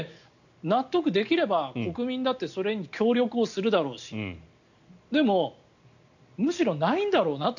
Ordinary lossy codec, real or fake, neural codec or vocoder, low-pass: none; real; none; 7.2 kHz